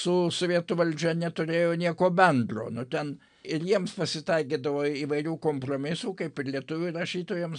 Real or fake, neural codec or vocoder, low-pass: real; none; 9.9 kHz